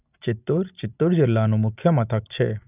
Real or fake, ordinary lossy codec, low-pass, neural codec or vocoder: real; none; 3.6 kHz; none